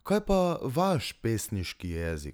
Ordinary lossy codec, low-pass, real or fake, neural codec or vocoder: none; none; real; none